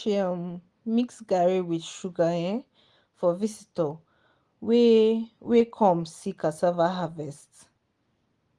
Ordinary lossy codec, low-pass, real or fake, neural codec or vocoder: Opus, 24 kbps; 10.8 kHz; real; none